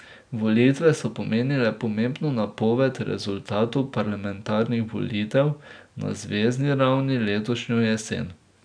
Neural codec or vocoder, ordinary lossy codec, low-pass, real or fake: none; none; 9.9 kHz; real